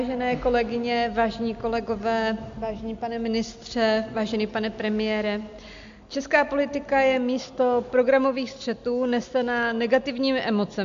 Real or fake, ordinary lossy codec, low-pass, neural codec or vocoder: real; AAC, 64 kbps; 7.2 kHz; none